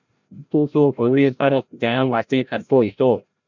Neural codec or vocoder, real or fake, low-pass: codec, 16 kHz, 0.5 kbps, FreqCodec, larger model; fake; 7.2 kHz